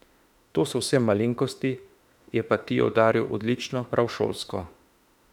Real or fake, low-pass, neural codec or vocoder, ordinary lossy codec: fake; 19.8 kHz; autoencoder, 48 kHz, 32 numbers a frame, DAC-VAE, trained on Japanese speech; none